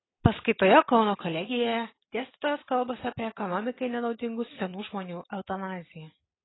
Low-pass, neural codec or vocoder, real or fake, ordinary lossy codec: 7.2 kHz; none; real; AAC, 16 kbps